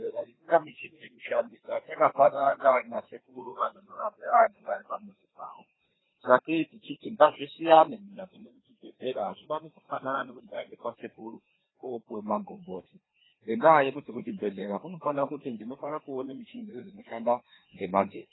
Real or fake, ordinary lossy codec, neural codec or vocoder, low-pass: fake; AAC, 16 kbps; codec, 16 kHz, 2 kbps, FreqCodec, larger model; 7.2 kHz